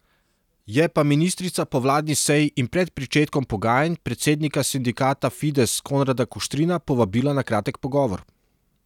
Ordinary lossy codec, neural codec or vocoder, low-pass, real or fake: none; none; 19.8 kHz; real